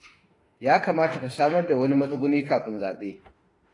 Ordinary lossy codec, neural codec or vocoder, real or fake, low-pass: AAC, 32 kbps; autoencoder, 48 kHz, 32 numbers a frame, DAC-VAE, trained on Japanese speech; fake; 10.8 kHz